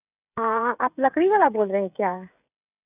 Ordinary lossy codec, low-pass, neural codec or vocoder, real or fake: none; 3.6 kHz; codec, 16 kHz, 16 kbps, FreqCodec, smaller model; fake